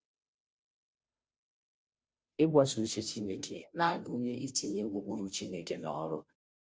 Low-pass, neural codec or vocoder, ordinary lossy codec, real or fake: none; codec, 16 kHz, 0.5 kbps, FunCodec, trained on Chinese and English, 25 frames a second; none; fake